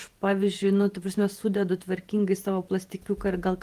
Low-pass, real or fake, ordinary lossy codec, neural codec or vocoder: 14.4 kHz; fake; Opus, 24 kbps; vocoder, 48 kHz, 128 mel bands, Vocos